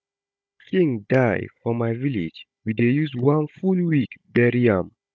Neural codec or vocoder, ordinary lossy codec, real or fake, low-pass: codec, 16 kHz, 16 kbps, FunCodec, trained on Chinese and English, 50 frames a second; none; fake; none